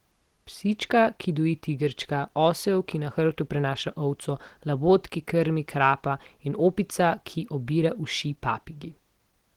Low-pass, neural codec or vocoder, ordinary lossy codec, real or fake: 19.8 kHz; none; Opus, 16 kbps; real